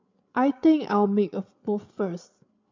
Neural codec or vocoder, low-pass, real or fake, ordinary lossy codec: codec, 16 kHz, 8 kbps, FreqCodec, larger model; 7.2 kHz; fake; MP3, 64 kbps